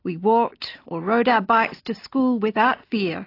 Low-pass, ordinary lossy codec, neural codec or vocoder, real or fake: 5.4 kHz; AAC, 32 kbps; none; real